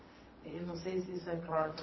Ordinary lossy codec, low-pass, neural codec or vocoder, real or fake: MP3, 24 kbps; 7.2 kHz; vocoder, 22.05 kHz, 80 mel bands, WaveNeXt; fake